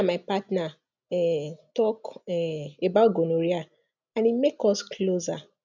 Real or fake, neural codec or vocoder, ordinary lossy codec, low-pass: real; none; none; 7.2 kHz